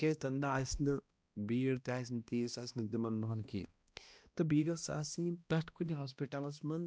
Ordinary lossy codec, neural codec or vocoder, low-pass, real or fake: none; codec, 16 kHz, 1 kbps, X-Codec, HuBERT features, trained on balanced general audio; none; fake